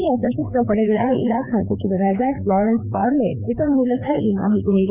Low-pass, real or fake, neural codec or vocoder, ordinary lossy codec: 3.6 kHz; fake; codec, 16 kHz, 2 kbps, FreqCodec, larger model; none